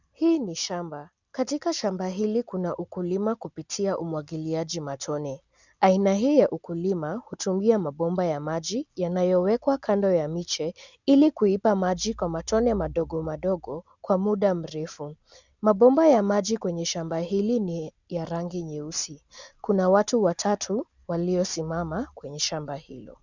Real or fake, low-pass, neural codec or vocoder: real; 7.2 kHz; none